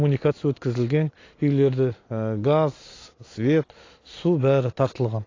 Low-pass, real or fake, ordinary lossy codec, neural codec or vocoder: 7.2 kHz; real; AAC, 32 kbps; none